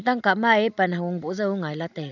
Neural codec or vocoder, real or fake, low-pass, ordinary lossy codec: none; real; 7.2 kHz; none